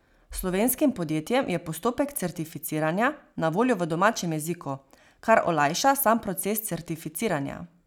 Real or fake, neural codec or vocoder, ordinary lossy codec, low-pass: real; none; none; none